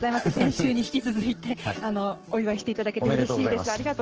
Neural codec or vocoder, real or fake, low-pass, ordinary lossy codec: codec, 44.1 kHz, 7.8 kbps, Pupu-Codec; fake; 7.2 kHz; Opus, 16 kbps